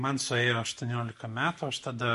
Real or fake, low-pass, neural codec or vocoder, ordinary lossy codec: real; 14.4 kHz; none; MP3, 48 kbps